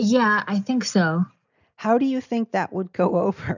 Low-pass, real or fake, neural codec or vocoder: 7.2 kHz; real; none